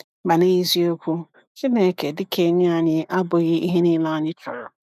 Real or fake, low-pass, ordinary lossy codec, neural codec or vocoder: fake; 14.4 kHz; none; autoencoder, 48 kHz, 128 numbers a frame, DAC-VAE, trained on Japanese speech